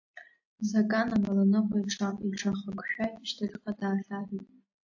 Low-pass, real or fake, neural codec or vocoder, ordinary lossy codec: 7.2 kHz; real; none; AAC, 48 kbps